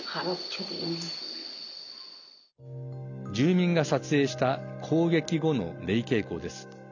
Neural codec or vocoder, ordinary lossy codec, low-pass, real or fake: none; none; 7.2 kHz; real